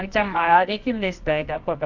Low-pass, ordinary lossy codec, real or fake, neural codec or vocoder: 7.2 kHz; none; fake; codec, 24 kHz, 0.9 kbps, WavTokenizer, medium music audio release